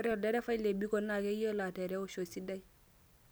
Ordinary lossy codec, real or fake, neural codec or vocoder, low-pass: none; real; none; none